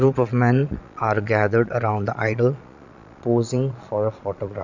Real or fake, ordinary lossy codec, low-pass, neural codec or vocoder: fake; none; 7.2 kHz; vocoder, 22.05 kHz, 80 mel bands, Vocos